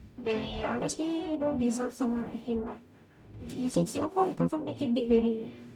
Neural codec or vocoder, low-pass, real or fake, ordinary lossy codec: codec, 44.1 kHz, 0.9 kbps, DAC; 19.8 kHz; fake; none